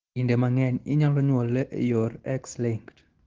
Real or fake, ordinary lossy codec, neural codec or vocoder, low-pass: real; Opus, 16 kbps; none; 7.2 kHz